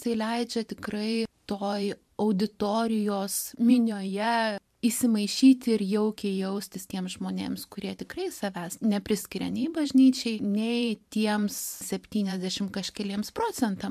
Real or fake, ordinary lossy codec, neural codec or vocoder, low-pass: fake; MP3, 96 kbps; vocoder, 44.1 kHz, 128 mel bands every 512 samples, BigVGAN v2; 14.4 kHz